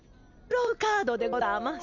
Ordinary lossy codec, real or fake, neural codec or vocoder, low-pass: none; real; none; 7.2 kHz